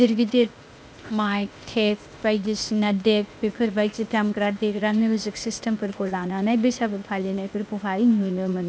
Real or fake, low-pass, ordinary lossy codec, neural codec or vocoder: fake; none; none; codec, 16 kHz, 0.8 kbps, ZipCodec